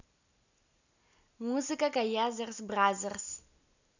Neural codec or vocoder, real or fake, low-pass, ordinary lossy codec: none; real; 7.2 kHz; none